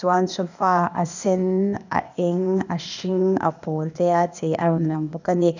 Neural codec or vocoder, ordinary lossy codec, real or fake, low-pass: codec, 16 kHz, 0.8 kbps, ZipCodec; none; fake; 7.2 kHz